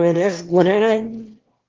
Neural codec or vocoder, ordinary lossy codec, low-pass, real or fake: autoencoder, 22.05 kHz, a latent of 192 numbers a frame, VITS, trained on one speaker; Opus, 16 kbps; 7.2 kHz; fake